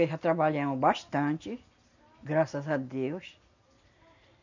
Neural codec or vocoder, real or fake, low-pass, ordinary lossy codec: none; real; 7.2 kHz; none